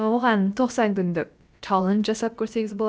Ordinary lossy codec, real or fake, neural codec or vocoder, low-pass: none; fake; codec, 16 kHz, about 1 kbps, DyCAST, with the encoder's durations; none